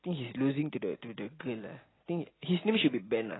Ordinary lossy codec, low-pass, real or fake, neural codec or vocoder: AAC, 16 kbps; 7.2 kHz; real; none